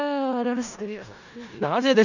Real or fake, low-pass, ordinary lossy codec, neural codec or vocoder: fake; 7.2 kHz; none; codec, 16 kHz in and 24 kHz out, 0.4 kbps, LongCat-Audio-Codec, four codebook decoder